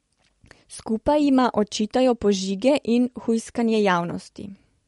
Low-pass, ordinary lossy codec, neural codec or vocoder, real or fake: 19.8 kHz; MP3, 48 kbps; none; real